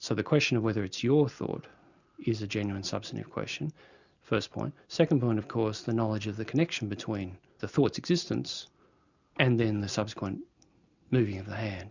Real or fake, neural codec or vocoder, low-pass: real; none; 7.2 kHz